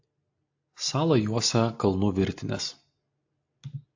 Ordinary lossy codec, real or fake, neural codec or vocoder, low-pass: AAC, 48 kbps; real; none; 7.2 kHz